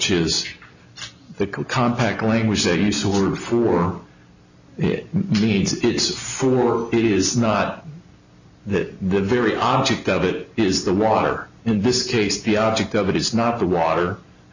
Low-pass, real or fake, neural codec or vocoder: 7.2 kHz; real; none